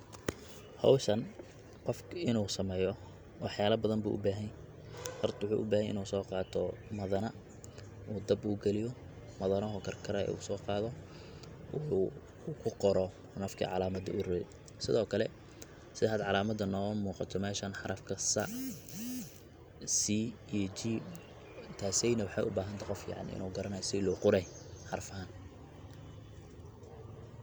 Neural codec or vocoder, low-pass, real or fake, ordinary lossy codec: none; none; real; none